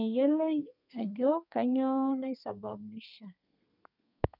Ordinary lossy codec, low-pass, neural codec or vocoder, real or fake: none; 5.4 kHz; codec, 32 kHz, 1.9 kbps, SNAC; fake